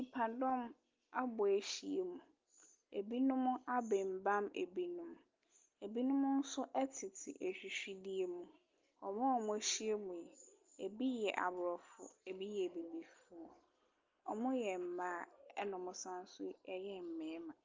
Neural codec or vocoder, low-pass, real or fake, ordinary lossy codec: none; 7.2 kHz; real; Opus, 32 kbps